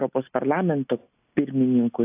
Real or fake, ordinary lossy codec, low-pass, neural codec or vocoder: real; AAC, 32 kbps; 3.6 kHz; none